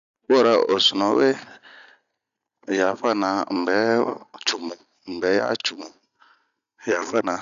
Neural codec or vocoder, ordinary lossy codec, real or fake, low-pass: none; none; real; 7.2 kHz